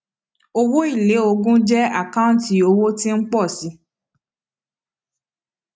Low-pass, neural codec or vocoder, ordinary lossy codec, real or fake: none; none; none; real